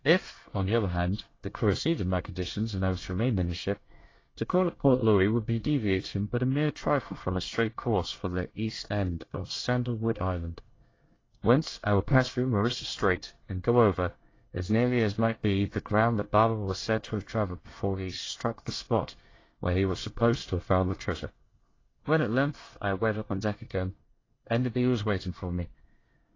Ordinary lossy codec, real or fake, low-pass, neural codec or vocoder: AAC, 32 kbps; fake; 7.2 kHz; codec, 24 kHz, 1 kbps, SNAC